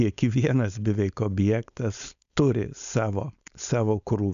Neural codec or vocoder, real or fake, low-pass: codec, 16 kHz, 4.8 kbps, FACodec; fake; 7.2 kHz